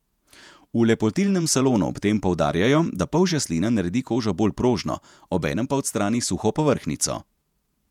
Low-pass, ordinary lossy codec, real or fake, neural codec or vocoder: 19.8 kHz; none; fake; vocoder, 48 kHz, 128 mel bands, Vocos